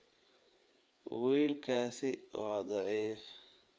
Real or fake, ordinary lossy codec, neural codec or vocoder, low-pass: fake; none; codec, 16 kHz, 4 kbps, FreqCodec, larger model; none